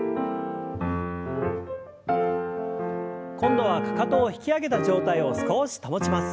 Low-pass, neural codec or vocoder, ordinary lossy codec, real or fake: none; none; none; real